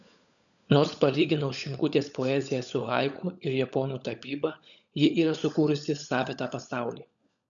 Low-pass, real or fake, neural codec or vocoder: 7.2 kHz; fake; codec, 16 kHz, 16 kbps, FunCodec, trained on LibriTTS, 50 frames a second